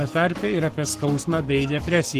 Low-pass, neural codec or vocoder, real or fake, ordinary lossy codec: 14.4 kHz; codec, 44.1 kHz, 7.8 kbps, Pupu-Codec; fake; Opus, 16 kbps